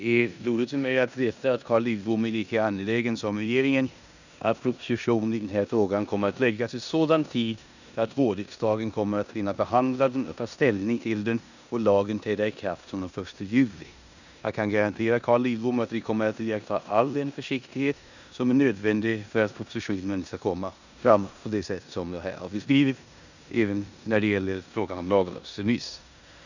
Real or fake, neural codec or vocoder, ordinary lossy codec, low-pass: fake; codec, 16 kHz in and 24 kHz out, 0.9 kbps, LongCat-Audio-Codec, four codebook decoder; none; 7.2 kHz